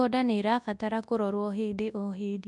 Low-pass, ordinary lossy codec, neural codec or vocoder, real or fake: 10.8 kHz; none; codec, 24 kHz, 0.9 kbps, WavTokenizer, large speech release; fake